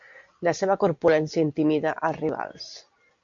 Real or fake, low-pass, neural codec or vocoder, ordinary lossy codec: real; 7.2 kHz; none; AAC, 64 kbps